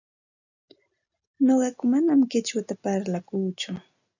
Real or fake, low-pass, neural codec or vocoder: real; 7.2 kHz; none